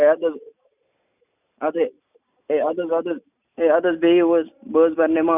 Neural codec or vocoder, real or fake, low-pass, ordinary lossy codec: none; real; 3.6 kHz; none